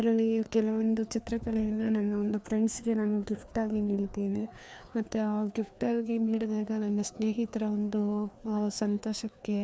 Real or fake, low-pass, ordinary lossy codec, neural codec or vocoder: fake; none; none; codec, 16 kHz, 2 kbps, FreqCodec, larger model